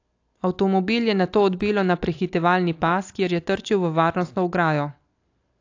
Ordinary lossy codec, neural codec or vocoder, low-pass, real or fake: AAC, 48 kbps; none; 7.2 kHz; real